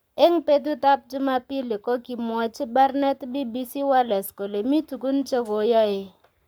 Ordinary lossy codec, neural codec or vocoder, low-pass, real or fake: none; codec, 44.1 kHz, 7.8 kbps, Pupu-Codec; none; fake